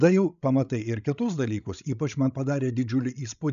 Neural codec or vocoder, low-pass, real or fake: codec, 16 kHz, 16 kbps, FreqCodec, larger model; 7.2 kHz; fake